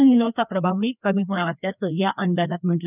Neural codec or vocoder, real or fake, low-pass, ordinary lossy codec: codec, 16 kHz, 2 kbps, FreqCodec, larger model; fake; 3.6 kHz; none